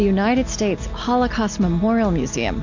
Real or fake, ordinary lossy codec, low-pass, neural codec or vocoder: real; MP3, 48 kbps; 7.2 kHz; none